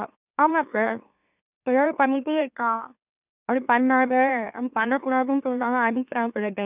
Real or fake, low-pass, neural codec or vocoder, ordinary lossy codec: fake; 3.6 kHz; autoencoder, 44.1 kHz, a latent of 192 numbers a frame, MeloTTS; none